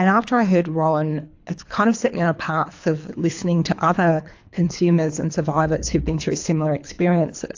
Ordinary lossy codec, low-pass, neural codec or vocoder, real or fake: AAC, 48 kbps; 7.2 kHz; codec, 24 kHz, 3 kbps, HILCodec; fake